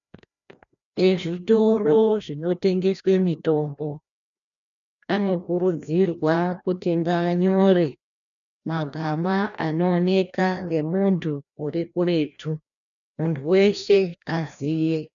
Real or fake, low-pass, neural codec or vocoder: fake; 7.2 kHz; codec, 16 kHz, 1 kbps, FreqCodec, larger model